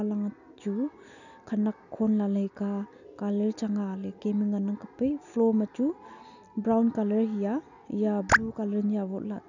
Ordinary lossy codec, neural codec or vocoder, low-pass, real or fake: none; none; 7.2 kHz; real